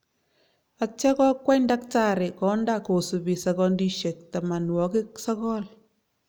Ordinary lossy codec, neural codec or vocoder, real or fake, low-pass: none; none; real; none